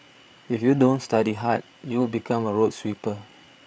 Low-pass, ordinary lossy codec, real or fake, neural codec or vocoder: none; none; fake; codec, 16 kHz, 8 kbps, FreqCodec, larger model